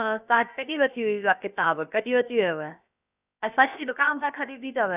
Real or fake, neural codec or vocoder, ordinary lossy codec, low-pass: fake; codec, 16 kHz, about 1 kbps, DyCAST, with the encoder's durations; none; 3.6 kHz